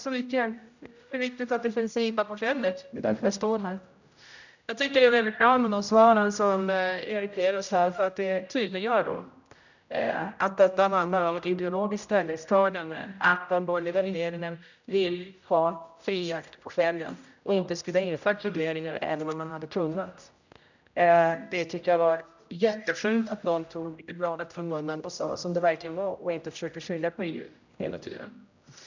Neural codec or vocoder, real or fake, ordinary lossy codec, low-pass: codec, 16 kHz, 0.5 kbps, X-Codec, HuBERT features, trained on general audio; fake; none; 7.2 kHz